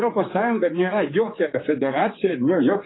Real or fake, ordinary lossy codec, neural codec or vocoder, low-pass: fake; AAC, 16 kbps; codec, 16 kHz, 4 kbps, X-Codec, HuBERT features, trained on general audio; 7.2 kHz